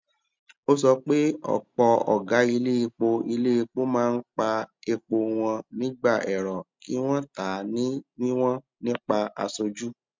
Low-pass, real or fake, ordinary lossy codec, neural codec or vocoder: 7.2 kHz; real; MP3, 64 kbps; none